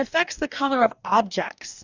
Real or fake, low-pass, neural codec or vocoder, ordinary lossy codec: fake; 7.2 kHz; codec, 44.1 kHz, 2.6 kbps, DAC; Opus, 64 kbps